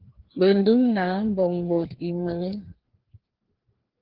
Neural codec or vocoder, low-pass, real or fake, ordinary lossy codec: codec, 16 kHz, 2 kbps, FreqCodec, larger model; 5.4 kHz; fake; Opus, 16 kbps